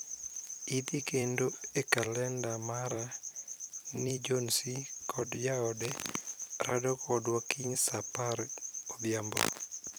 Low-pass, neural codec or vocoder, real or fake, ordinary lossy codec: none; vocoder, 44.1 kHz, 128 mel bands every 256 samples, BigVGAN v2; fake; none